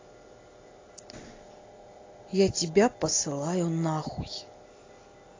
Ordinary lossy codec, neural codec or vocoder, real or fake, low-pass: AAC, 32 kbps; none; real; 7.2 kHz